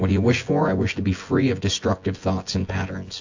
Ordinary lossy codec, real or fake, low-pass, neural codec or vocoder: AAC, 48 kbps; fake; 7.2 kHz; vocoder, 24 kHz, 100 mel bands, Vocos